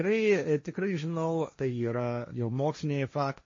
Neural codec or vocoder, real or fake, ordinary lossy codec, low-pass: codec, 16 kHz, 1.1 kbps, Voila-Tokenizer; fake; MP3, 32 kbps; 7.2 kHz